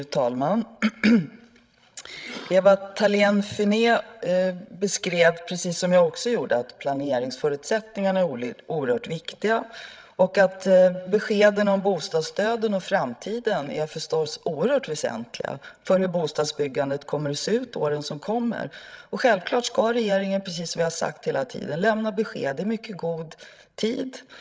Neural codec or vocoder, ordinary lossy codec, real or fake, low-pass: codec, 16 kHz, 16 kbps, FreqCodec, larger model; none; fake; none